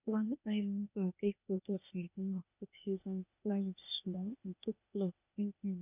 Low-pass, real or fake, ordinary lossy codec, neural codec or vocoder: 3.6 kHz; fake; none; codec, 16 kHz, 0.8 kbps, ZipCodec